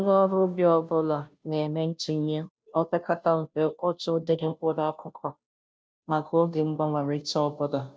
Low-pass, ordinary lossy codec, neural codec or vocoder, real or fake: none; none; codec, 16 kHz, 0.5 kbps, FunCodec, trained on Chinese and English, 25 frames a second; fake